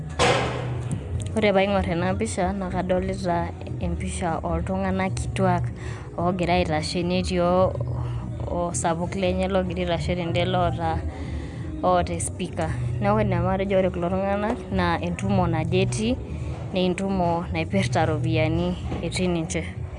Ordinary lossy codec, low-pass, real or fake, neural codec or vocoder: none; 10.8 kHz; real; none